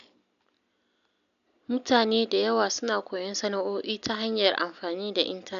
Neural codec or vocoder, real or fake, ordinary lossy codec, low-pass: none; real; none; 7.2 kHz